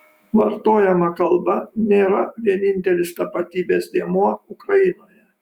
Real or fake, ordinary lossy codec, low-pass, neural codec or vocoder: fake; Opus, 64 kbps; 19.8 kHz; autoencoder, 48 kHz, 128 numbers a frame, DAC-VAE, trained on Japanese speech